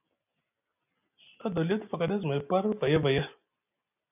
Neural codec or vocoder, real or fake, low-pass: none; real; 3.6 kHz